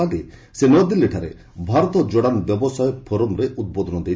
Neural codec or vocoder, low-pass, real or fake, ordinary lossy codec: none; none; real; none